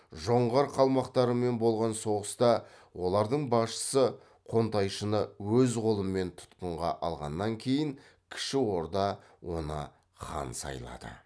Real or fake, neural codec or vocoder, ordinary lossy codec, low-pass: real; none; none; none